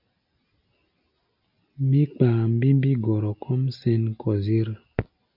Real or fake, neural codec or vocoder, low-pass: real; none; 5.4 kHz